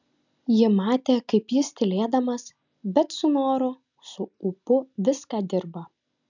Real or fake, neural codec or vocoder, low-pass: real; none; 7.2 kHz